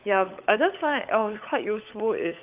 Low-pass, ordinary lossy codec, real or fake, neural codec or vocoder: 3.6 kHz; Opus, 32 kbps; fake; codec, 16 kHz, 16 kbps, FunCodec, trained on LibriTTS, 50 frames a second